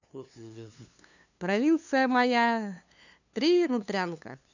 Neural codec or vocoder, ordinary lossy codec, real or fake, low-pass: codec, 16 kHz, 2 kbps, FunCodec, trained on LibriTTS, 25 frames a second; none; fake; 7.2 kHz